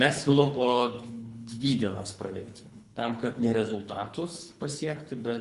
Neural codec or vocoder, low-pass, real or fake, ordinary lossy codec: codec, 24 kHz, 3 kbps, HILCodec; 10.8 kHz; fake; Opus, 64 kbps